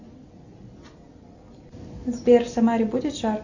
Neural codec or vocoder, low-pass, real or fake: none; 7.2 kHz; real